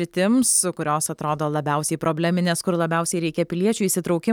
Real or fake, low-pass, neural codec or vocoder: real; 19.8 kHz; none